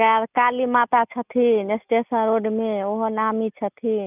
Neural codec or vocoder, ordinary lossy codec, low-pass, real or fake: none; none; 3.6 kHz; real